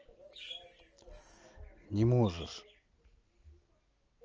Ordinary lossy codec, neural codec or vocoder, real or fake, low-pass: Opus, 24 kbps; none; real; 7.2 kHz